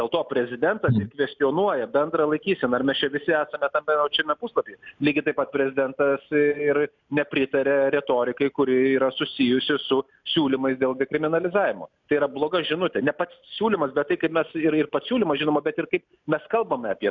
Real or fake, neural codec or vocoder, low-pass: real; none; 7.2 kHz